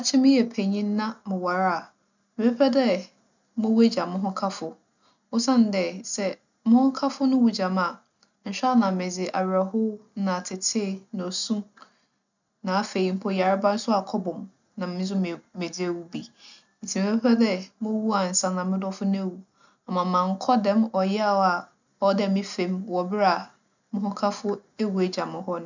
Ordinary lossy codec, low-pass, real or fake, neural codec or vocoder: none; 7.2 kHz; real; none